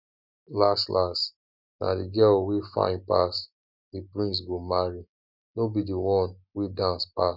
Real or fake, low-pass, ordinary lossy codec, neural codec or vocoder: real; 5.4 kHz; none; none